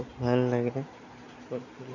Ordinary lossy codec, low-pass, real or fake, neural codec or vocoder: none; 7.2 kHz; real; none